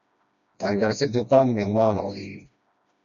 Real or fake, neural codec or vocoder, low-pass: fake; codec, 16 kHz, 1 kbps, FreqCodec, smaller model; 7.2 kHz